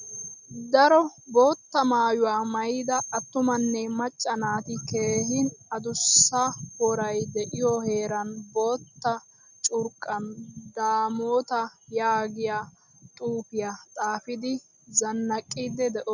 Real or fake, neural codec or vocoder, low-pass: real; none; 7.2 kHz